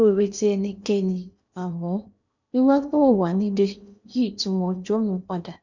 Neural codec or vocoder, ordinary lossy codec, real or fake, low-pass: codec, 16 kHz in and 24 kHz out, 0.8 kbps, FocalCodec, streaming, 65536 codes; none; fake; 7.2 kHz